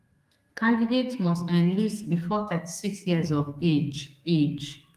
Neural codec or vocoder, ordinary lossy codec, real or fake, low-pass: codec, 32 kHz, 1.9 kbps, SNAC; Opus, 32 kbps; fake; 14.4 kHz